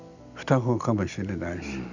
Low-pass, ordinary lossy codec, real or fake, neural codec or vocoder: 7.2 kHz; none; real; none